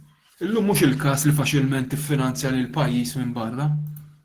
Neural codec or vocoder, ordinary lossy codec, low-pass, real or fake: none; Opus, 16 kbps; 14.4 kHz; real